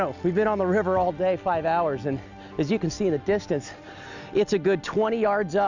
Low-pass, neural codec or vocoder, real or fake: 7.2 kHz; none; real